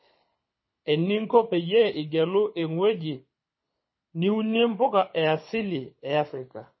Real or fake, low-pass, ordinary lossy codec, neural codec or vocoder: fake; 7.2 kHz; MP3, 24 kbps; codec, 24 kHz, 6 kbps, HILCodec